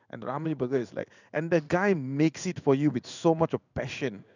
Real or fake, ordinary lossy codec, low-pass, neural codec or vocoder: fake; none; 7.2 kHz; codec, 16 kHz in and 24 kHz out, 1 kbps, XY-Tokenizer